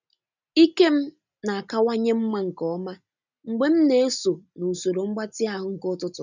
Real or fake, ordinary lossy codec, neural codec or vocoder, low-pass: real; none; none; 7.2 kHz